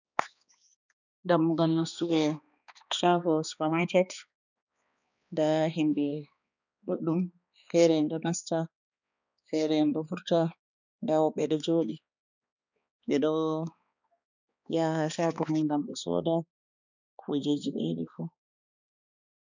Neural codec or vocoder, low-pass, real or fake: codec, 16 kHz, 2 kbps, X-Codec, HuBERT features, trained on balanced general audio; 7.2 kHz; fake